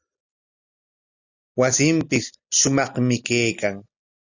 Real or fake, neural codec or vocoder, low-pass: real; none; 7.2 kHz